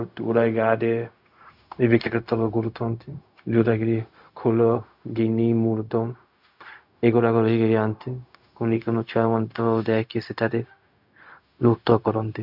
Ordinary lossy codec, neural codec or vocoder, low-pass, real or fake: none; codec, 16 kHz, 0.4 kbps, LongCat-Audio-Codec; 5.4 kHz; fake